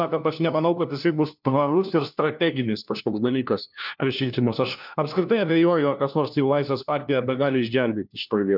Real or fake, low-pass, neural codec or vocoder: fake; 5.4 kHz; codec, 16 kHz, 1 kbps, FunCodec, trained on LibriTTS, 50 frames a second